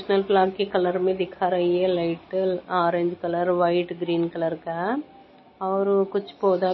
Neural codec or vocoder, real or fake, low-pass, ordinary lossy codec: none; real; 7.2 kHz; MP3, 24 kbps